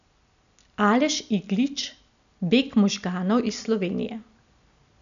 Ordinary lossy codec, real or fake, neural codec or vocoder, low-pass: MP3, 96 kbps; real; none; 7.2 kHz